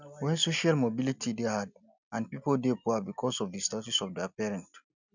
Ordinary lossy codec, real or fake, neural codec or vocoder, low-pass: none; real; none; 7.2 kHz